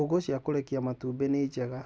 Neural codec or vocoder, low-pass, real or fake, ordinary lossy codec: none; none; real; none